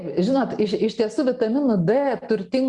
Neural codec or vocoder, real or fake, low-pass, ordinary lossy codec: none; real; 10.8 kHz; Opus, 64 kbps